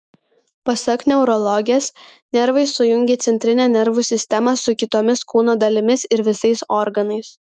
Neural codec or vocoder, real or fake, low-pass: autoencoder, 48 kHz, 128 numbers a frame, DAC-VAE, trained on Japanese speech; fake; 9.9 kHz